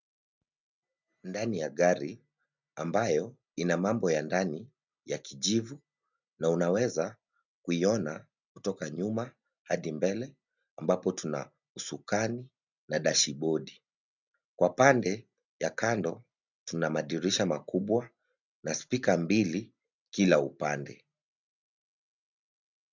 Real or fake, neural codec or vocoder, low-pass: real; none; 7.2 kHz